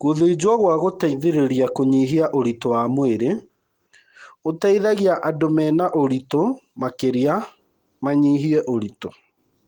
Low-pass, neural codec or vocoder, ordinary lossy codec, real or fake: 19.8 kHz; none; Opus, 24 kbps; real